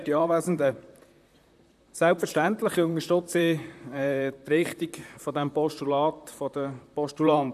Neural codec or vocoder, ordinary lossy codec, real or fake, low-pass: vocoder, 44.1 kHz, 128 mel bands, Pupu-Vocoder; none; fake; 14.4 kHz